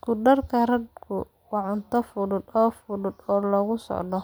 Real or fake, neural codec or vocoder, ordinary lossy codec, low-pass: real; none; none; none